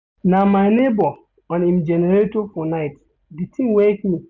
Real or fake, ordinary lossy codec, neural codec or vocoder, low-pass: real; none; none; 7.2 kHz